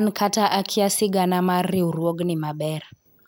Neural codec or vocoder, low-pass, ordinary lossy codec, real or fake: none; none; none; real